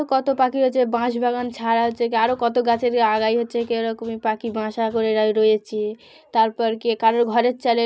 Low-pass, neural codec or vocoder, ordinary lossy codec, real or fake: none; none; none; real